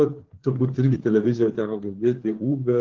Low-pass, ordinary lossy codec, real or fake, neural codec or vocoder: 7.2 kHz; Opus, 16 kbps; fake; codec, 16 kHz, 4 kbps, FreqCodec, larger model